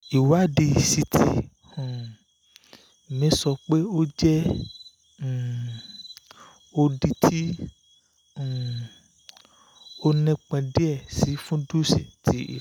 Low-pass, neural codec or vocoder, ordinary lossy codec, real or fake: 19.8 kHz; none; none; real